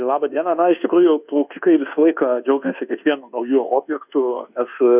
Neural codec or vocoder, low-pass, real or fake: codec, 24 kHz, 1.2 kbps, DualCodec; 3.6 kHz; fake